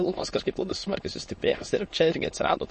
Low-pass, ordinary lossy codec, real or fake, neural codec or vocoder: 9.9 kHz; MP3, 32 kbps; fake; autoencoder, 22.05 kHz, a latent of 192 numbers a frame, VITS, trained on many speakers